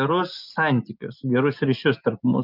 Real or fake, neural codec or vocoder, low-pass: real; none; 5.4 kHz